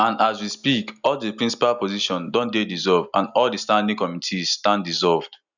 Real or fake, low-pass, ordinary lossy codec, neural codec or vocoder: real; 7.2 kHz; none; none